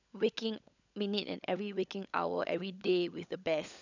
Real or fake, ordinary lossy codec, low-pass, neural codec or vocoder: fake; none; 7.2 kHz; codec, 16 kHz, 16 kbps, FunCodec, trained on LibriTTS, 50 frames a second